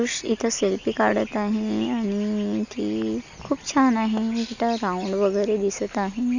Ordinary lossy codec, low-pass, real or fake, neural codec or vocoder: none; 7.2 kHz; real; none